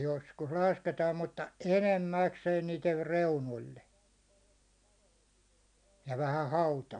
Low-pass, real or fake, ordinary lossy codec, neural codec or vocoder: 9.9 kHz; real; none; none